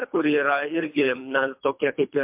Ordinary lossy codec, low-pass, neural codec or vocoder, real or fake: MP3, 32 kbps; 3.6 kHz; codec, 24 kHz, 3 kbps, HILCodec; fake